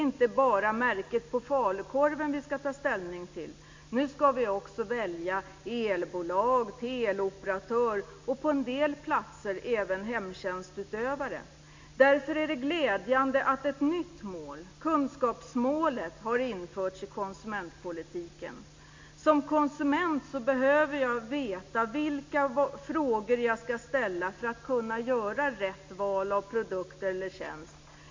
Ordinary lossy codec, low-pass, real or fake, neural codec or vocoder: MP3, 48 kbps; 7.2 kHz; real; none